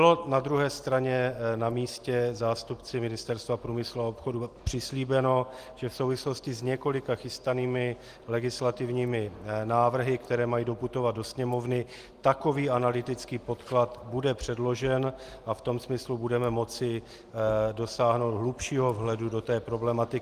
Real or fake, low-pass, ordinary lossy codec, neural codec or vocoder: real; 14.4 kHz; Opus, 24 kbps; none